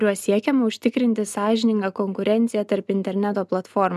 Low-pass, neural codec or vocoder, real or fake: 14.4 kHz; none; real